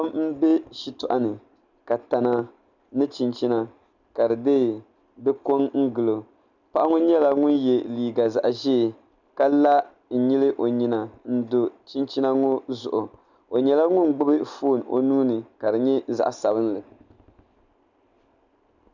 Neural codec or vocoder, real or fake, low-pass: none; real; 7.2 kHz